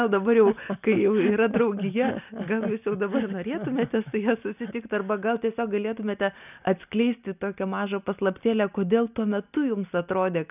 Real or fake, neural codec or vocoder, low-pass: real; none; 3.6 kHz